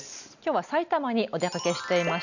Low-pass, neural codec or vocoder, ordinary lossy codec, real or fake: 7.2 kHz; none; none; real